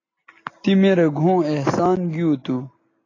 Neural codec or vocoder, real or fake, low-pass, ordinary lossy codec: none; real; 7.2 kHz; AAC, 32 kbps